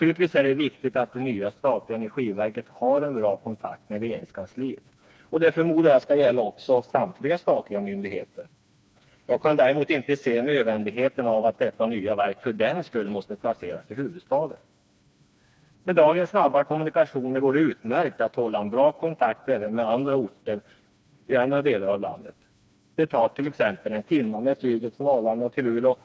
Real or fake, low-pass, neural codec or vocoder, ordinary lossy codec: fake; none; codec, 16 kHz, 2 kbps, FreqCodec, smaller model; none